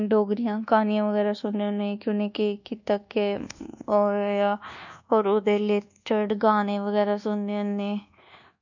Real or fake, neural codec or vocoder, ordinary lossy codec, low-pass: fake; codec, 24 kHz, 1.2 kbps, DualCodec; none; 7.2 kHz